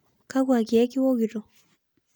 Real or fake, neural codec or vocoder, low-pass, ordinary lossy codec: real; none; none; none